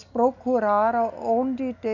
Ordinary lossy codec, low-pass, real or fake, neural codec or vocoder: none; 7.2 kHz; real; none